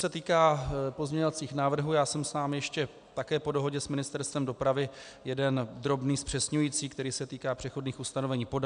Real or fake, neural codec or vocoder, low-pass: real; none; 9.9 kHz